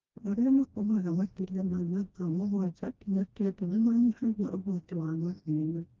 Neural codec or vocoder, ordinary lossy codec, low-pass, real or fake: codec, 16 kHz, 1 kbps, FreqCodec, smaller model; Opus, 24 kbps; 7.2 kHz; fake